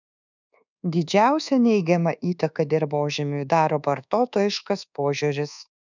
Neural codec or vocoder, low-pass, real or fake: codec, 24 kHz, 1.2 kbps, DualCodec; 7.2 kHz; fake